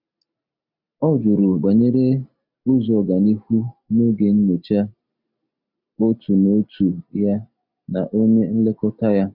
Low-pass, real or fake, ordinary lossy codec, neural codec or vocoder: 5.4 kHz; real; none; none